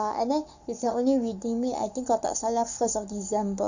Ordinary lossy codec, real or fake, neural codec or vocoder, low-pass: none; fake; codec, 44.1 kHz, 7.8 kbps, Pupu-Codec; 7.2 kHz